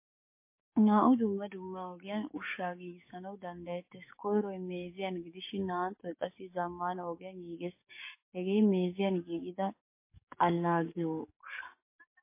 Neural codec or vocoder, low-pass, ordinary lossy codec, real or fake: codec, 44.1 kHz, 7.8 kbps, DAC; 3.6 kHz; MP3, 24 kbps; fake